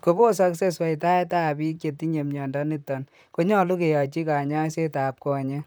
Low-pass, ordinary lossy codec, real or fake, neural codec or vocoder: none; none; fake; vocoder, 44.1 kHz, 128 mel bands, Pupu-Vocoder